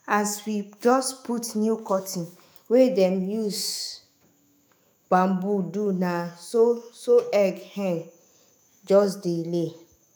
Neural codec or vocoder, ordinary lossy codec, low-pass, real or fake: autoencoder, 48 kHz, 128 numbers a frame, DAC-VAE, trained on Japanese speech; none; none; fake